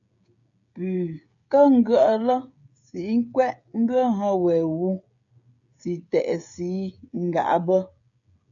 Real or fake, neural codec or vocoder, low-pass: fake; codec, 16 kHz, 16 kbps, FreqCodec, smaller model; 7.2 kHz